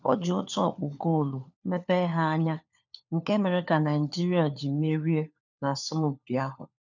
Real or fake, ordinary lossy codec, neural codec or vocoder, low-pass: fake; none; codec, 16 kHz, 4 kbps, FunCodec, trained on LibriTTS, 50 frames a second; 7.2 kHz